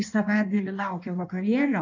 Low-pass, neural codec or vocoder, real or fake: 7.2 kHz; codec, 16 kHz in and 24 kHz out, 1.1 kbps, FireRedTTS-2 codec; fake